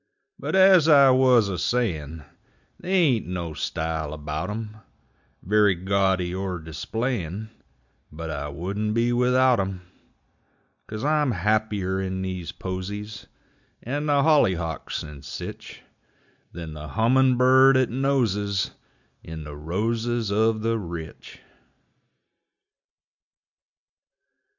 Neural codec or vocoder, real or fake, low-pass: none; real; 7.2 kHz